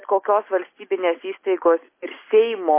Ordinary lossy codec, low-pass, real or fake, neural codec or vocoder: MP3, 24 kbps; 3.6 kHz; real; none